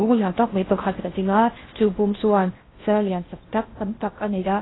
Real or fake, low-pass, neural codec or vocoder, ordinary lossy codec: fake; 7.2 kHz; codec, 16 kHz in and 24 kHz out, 0.6 kbps, FocalCodec, streaming, 4096 codes; AAC, 16 kbps